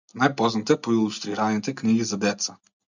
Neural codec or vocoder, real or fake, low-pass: none; real; 7.2 kHz